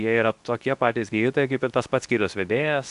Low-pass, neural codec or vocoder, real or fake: 10.8 kHz; codec, 24 kHz, 0.9 kbps, WavTokenizer, medium speech release version 2; fake